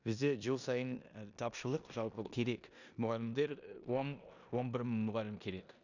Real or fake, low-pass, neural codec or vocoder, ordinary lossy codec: fake; 7.2 kHz; codec, 16 kHz in and 24 kHz out, 0.9 kbps, LongCat-Audio-Codec, four codebook decoder; none